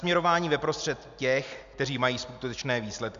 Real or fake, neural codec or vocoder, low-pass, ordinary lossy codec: real; none; 7.2 kHz; MP3, 64 kbps